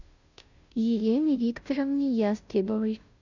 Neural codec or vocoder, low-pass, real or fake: codec, 16 kHz, 0.5 kbps, FunCodec, trained on Chinese and English, 25 frames a second; 7.2 kHz; fake